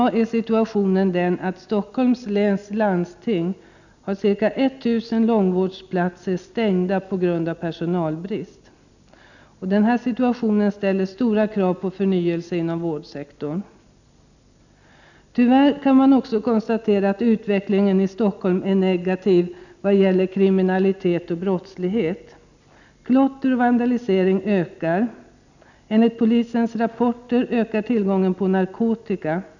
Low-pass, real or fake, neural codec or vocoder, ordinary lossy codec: 7.2 kHz; real; none; none